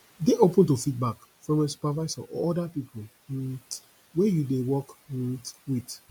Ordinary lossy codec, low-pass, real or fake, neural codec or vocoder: MP3, 96 kbps; 19.8 kHz; real; none